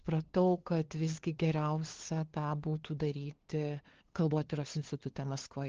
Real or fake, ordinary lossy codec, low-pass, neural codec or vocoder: fake; Opus, 16 kbps; 7.2 kHz; codec, 16 kHz, 2 kbps, FunCodec, trained on LibriTTS, 25 frames a second